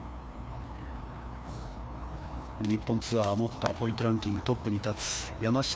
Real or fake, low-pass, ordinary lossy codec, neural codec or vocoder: fake; none; none; codec, 16 kHz, 2 kbps, FreqCodec, larger model